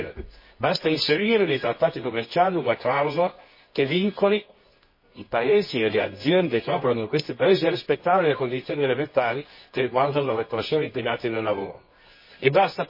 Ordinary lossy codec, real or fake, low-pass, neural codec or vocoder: MP3, 24 kbps; fake; 5.4 kHz; codec, 24 kHz, 0.9 kbps, WavTokenizer, medium music audio release